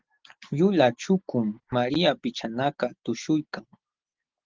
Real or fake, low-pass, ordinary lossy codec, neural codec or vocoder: real; 7.2 kHz; Opus, 16 kbps; none